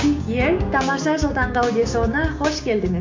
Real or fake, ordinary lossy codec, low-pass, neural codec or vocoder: fake; MP3, 48 kbps; 7.2 kHz; codec, 16 kHz, 6 kbps, DAC